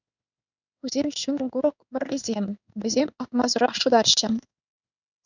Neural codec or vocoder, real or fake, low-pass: codec, 16 kHz in and 24 kHz out, 1 kbps, XY-Tokenizer; fake; 7.2 kHz